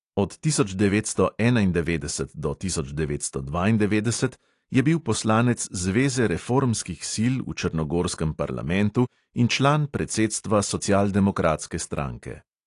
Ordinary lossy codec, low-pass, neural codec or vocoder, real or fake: AAC, 48 kbps; 10.8 kHz; none; real